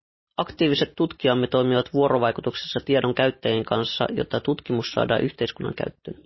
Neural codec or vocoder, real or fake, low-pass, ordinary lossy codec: none; real; 7.2 kHz; MP3, 24 kbps